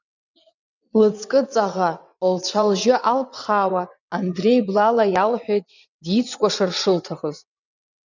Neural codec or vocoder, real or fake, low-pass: vocoder, 22.05 kHz, 80 mel bands, WaveNeXt; fake; 7.2 kHz